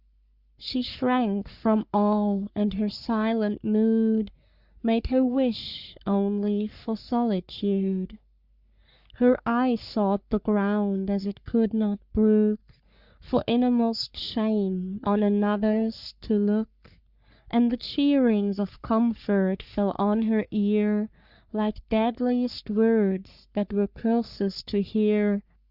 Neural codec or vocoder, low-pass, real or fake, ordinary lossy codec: codec, 44.1 kHz, 3.4 kbps, Pupu-Codec; 5.4 kHz; fake; Opus, 64 kbps